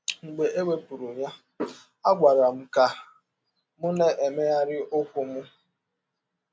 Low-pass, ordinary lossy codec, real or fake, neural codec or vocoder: none; none; real; none